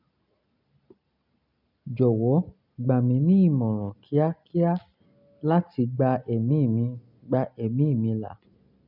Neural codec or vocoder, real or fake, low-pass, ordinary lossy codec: none; real; 5.4 kHz; none